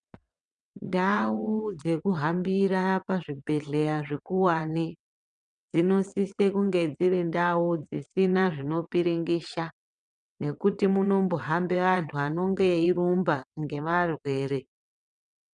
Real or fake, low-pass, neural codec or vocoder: fake; 9.9 kHz; vocoder, 22.05 kHz, 80 mel bands, WaveNeXt